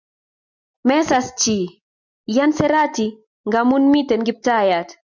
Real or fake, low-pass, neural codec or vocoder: real; 7.2 kHz; none